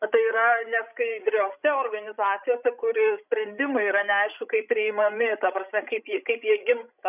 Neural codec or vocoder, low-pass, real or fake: codec, 16 kHz, 16 kbps, FreqCodec, larger model; 3.6 kHz; fake